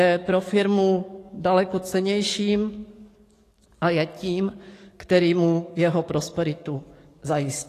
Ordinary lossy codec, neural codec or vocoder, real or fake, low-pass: AAC, 48 kbps; codec, 44.1 kHz, 7.8 kbps, DAC; fake; 14.4 kHz